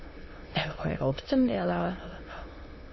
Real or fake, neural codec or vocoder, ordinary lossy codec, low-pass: fake; autoencoder, 22.05 kHz, a latent of 192 numbers a frame, VITS, trained on many speakers; MP3, 24 kbps; 7.2 kHz